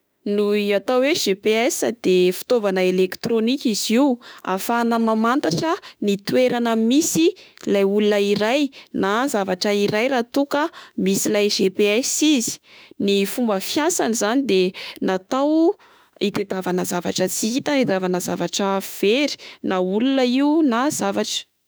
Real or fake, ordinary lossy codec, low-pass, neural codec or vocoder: fake; none; none; autoencoder, 48 kHz, 32 numbers a frame, DAC-VAE, trained on Japanese speech